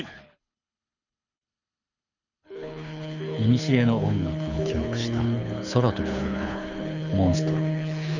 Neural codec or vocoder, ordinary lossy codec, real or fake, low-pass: codec, 24 kHz, 6 kbps, HILCodec; none; fake; 7.2 kHz